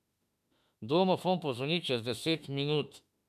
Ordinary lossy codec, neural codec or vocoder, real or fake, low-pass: none; autoencoder, 48 kHz, 32 numbers a frame, DAC-VAE, trained on Japanese speech; fake; 14.4 kHz